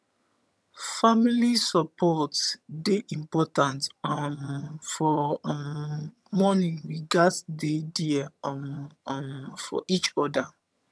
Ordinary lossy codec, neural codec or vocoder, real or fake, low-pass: none; vocoder, 22.05 kHz, 80 mel bands, HiFi-GAN; fake; none